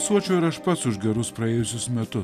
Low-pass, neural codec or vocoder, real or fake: 14.4 kHz; none; real